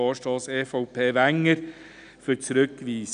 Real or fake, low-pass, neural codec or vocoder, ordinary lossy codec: real; 9.9 kHz; none; none